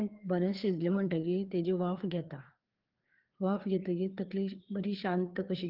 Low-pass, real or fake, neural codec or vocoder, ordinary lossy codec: 5.4 kHz; fake; codec, 16 kHz, 4 kbps, FreqCodec, larger model; Opus, 16 kbps